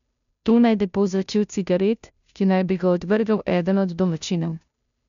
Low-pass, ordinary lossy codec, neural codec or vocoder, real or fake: 7.2 kHz; none; codec, 16 kHz, 0.5 kbps, FunCodec, trained on Chinese and English, 25 frames a second; fake